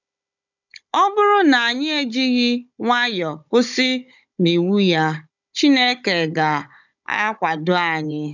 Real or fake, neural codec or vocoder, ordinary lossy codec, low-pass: fake; codec, 16 kHz, 16 kbps, FunCodec, trained on Chinese and English, 50 frames a second; none; 7.2 kHz